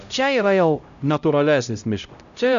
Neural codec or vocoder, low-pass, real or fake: codec, 16 kHz, 0.5 kbps, X-Codec, HuBERT features, trained on LibriSpeech; 7.2 kHz; fake